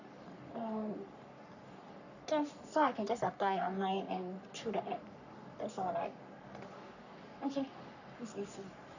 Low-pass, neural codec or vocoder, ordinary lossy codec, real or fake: 7.2 kHz; codec, 44.1 kHz, 3.4 kbps, Pupu-Codec; none; fake